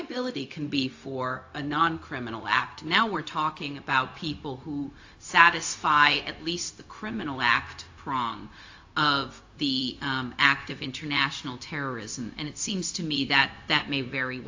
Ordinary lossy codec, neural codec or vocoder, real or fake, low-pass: AAC, 48 kbps; codec, 16 kHz, 0.4 kbps, LongCat-Audio-Codec; fake; 7.2 kHz